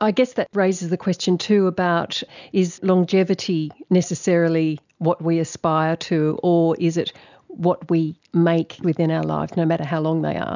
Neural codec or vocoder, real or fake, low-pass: none; real; 7.2 kHz